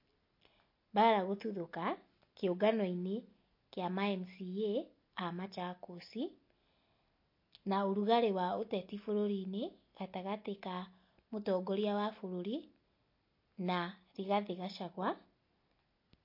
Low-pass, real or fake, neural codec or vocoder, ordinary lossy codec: 5.4 kHz; real; none; MP3, 32 kbps